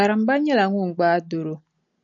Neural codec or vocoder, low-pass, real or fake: none; 7.2 kHz; real